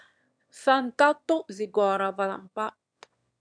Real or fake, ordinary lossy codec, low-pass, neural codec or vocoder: fake; MP3, 96 kbps; 9.9 kHz; autoencoder, 22.05 kHz, a latent of 192 numbers a frame, VITS, trained on one speaker